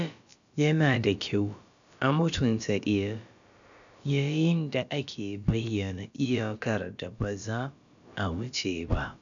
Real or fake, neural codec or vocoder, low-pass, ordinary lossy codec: fake; codec, 16 kHz, about 1 kbps, DyCAST, with the encoder's durations; 7.2 kHz; none